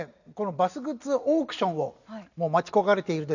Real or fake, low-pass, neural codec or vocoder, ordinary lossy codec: real; 7.2 kHz; none; none